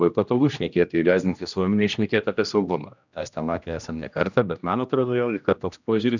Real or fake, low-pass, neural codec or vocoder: fake; 7.2 kHz; codec, 16 kHz, 1 kbps, X-Codec, HuBERT features, trained on general audio